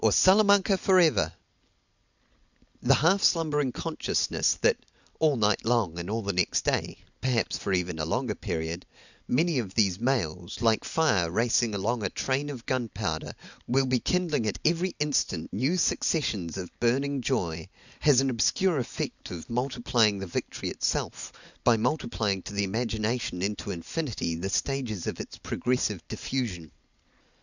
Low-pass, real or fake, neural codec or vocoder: 7.2 kHz; real; none